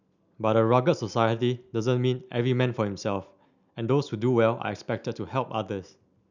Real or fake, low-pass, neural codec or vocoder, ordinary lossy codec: real; 7.2 kHz; none; none